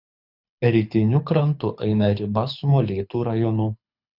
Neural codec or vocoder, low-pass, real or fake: codec, 24 kHz, 6 kbps, HILCodec; 5.4 kHz; fake